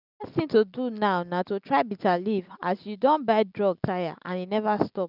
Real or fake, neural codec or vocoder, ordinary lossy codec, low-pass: real; none; none; 5.4 kHz